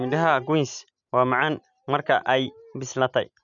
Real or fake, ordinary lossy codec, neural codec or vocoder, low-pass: real; none; none; 7.2 kHz